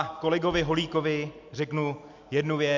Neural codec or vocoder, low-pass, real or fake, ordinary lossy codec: none; 7.2 kHz; real; MP3, 64 kbps